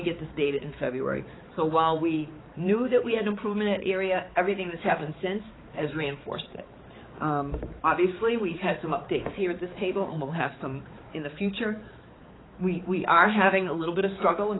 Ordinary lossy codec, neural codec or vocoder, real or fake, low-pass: AAC, 16 kbps; codec, 16 kHz, 4 kbps, X-Codec, HuBERT features, trained on balanced general audio; fake; 7.2 kHz